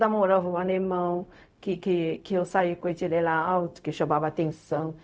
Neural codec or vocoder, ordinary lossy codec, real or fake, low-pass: codec, 16 kHz, 0.4 kbps, LongCat-Audio-Codec; none; fake; none